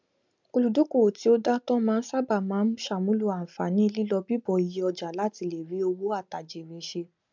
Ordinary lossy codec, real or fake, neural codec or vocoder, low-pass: MP3, 64 kbps; real; none; 7.2 kHz